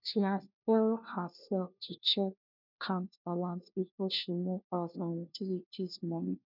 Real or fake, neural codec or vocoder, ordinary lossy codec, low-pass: fake; codec, 16 kHz, 1 kbps, FunCodec, trained on LibriTTS, 50 frames a second; none; 5.4 kHz